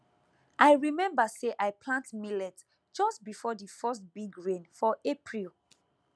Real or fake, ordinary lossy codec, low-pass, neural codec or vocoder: real; none; none; none